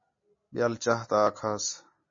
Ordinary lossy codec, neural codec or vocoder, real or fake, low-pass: MP3, 32 kbps; none; real; 7.2 kHz